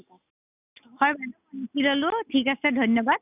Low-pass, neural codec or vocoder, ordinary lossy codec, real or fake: 3.6 kHz; none; none; real